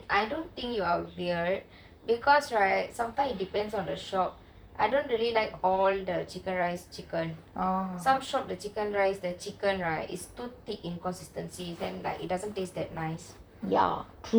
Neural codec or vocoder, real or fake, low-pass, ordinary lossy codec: vocoder, 44.1 kHz, 128 mel bands, Pupu-Vocoder; fake; none; none